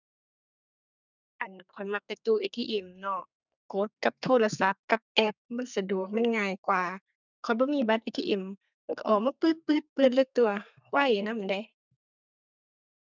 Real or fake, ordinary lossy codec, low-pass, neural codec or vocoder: fake; none; 7.2 kHz; codec, 32 kHz, 1.9 kbps, SNAC